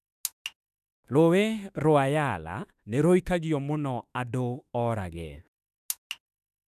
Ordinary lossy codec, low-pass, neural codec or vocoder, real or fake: none; 14.4 kHz; autoencoder, 48 kHz, 32 numbers a frame, DAC-VAE, trained on Japanese speech; fake